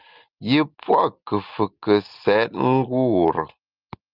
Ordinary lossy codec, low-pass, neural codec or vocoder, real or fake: Opus, 24 kbps; 5.4 kHz; none; real